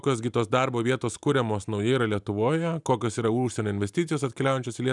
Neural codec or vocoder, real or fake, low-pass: none; real; 10.8 kHz